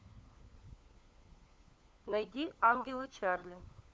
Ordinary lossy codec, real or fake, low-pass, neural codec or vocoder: none; fake; none; codec, 16 kHz, 4 kbps, FunCodec, trained on LibriTTS, 50 frames a second